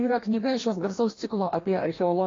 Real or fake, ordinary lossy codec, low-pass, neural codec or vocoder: fake; AAC, 32 kbps; 7.2 kHz; codec, 16 kHz, 1 kbps, FreqCodec, larger model